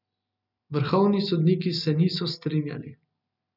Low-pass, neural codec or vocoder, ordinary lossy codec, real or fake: 5.4 kHz; none; none; real